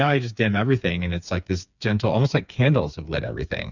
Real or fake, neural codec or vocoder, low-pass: fake; codec, 16 kHz, 4 kbps, FreqCodec, smaller model; 7.2 kHz